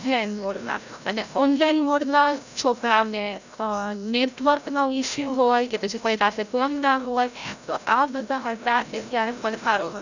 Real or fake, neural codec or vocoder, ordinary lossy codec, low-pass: fake; codec, 16 kHz, 0.5 kbps, FreqCodec, larger model; none; 7.2 kHz